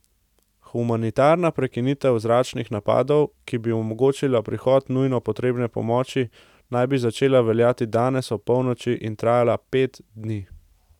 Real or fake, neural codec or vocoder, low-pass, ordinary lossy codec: real; none; 19.8 kHz; none